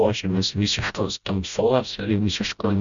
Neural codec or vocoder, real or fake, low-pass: codec, 16 kHz, 0.5 kbps, FreqCodec, smaller model; fake; 7.2 kHz